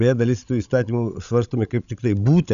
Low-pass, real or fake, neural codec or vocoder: 7.2 kHz; fake; codec, 16 kHz, 16 kbps, FreqCodec, larger model